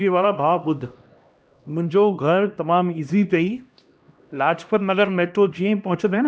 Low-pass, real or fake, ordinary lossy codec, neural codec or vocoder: none; fake; none; codec, 16 kHz, 1 kbps, X-Codec, HuBERT features, trained on LibriSpeech